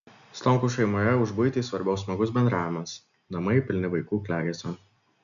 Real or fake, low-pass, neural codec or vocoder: real; 7.2 kHz; none